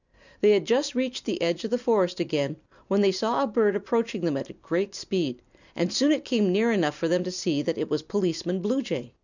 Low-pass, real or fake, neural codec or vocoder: 7.2 kHz; real; none